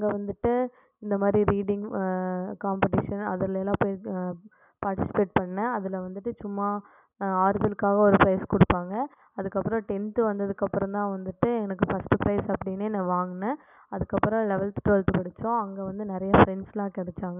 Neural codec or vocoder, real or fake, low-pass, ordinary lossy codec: none; real; 3.6 kHz; none